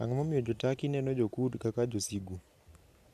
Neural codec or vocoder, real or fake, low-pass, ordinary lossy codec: vocoder, 44.1 kHz, 128 mel bands every 512 samples, BigVGAN v2; fake; 14.4 kHz; none